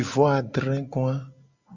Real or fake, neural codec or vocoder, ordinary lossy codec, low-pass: real; none; Opus, 64 kbps; 7.2 kHz